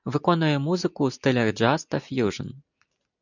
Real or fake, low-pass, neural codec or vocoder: real; 7.2 kHz; none